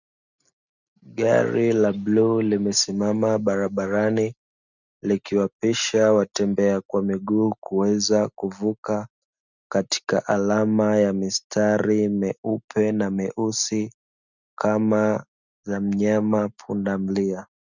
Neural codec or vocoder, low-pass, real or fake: none; 7.2 kHz; real